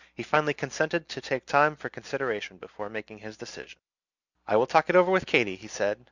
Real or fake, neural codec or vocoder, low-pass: real; none; 7.2 kHz